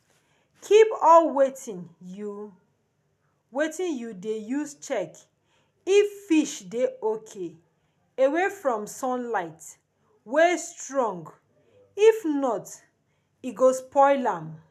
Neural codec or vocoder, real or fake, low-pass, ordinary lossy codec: none; real; 14.4 kHz; none